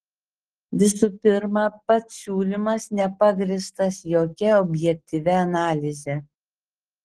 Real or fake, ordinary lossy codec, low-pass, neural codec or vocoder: real; Opus, 16 kbps; 9.9 kHz; none